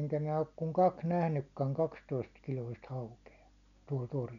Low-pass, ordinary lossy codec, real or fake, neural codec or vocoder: 7.2 kHz; none; real; none